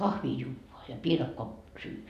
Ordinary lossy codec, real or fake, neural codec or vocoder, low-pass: none; real; none; 14.4 kHz